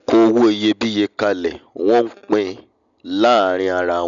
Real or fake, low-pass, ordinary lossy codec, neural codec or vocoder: real; 7.2 kHz; none; none